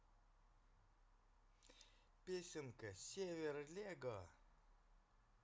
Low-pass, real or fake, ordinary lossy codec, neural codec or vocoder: none; real; none; none